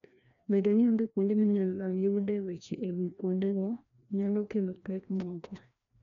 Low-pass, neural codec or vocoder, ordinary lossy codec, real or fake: 7.2 kHz; codec, 16 kHz, 1 kbps, FreqCodec, larger model; none; fake